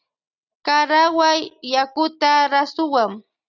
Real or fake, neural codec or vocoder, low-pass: real; none; 7.2 kHz